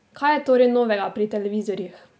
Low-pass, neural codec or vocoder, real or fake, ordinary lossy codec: none; none; real; none